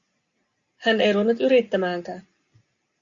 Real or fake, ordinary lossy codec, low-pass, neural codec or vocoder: real; Opus, 64 kbps; 7.2 kHz; none